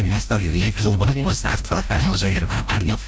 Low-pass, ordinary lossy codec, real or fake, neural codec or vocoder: none; none; fake; codec, 16 kHz, 0.5 kbps, FreqCodec, larger model